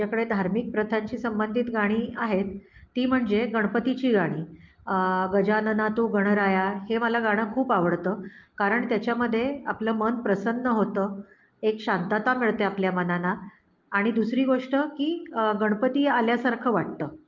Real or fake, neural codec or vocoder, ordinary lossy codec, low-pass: real; none; Opus, 32 kbps; 7.2 kHz